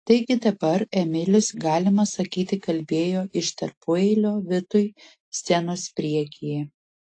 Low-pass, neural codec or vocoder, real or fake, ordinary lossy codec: 9.9 kHz; none; real; AAC, 48 kbps